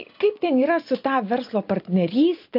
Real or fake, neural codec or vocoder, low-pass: real; none; 5.4 kHz